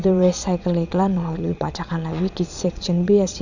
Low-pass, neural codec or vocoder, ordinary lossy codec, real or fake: 7.2 kHz; vocoder, 22.05 kHz, 80 mel bands, Vocos; none; fake